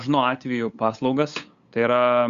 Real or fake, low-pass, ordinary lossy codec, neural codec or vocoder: fake; 7.2 kHz; Opus, 64 kbps; codec, 16 kHz, 8 kbps, FunCodec, trained on LibriTTS, 25 frames a second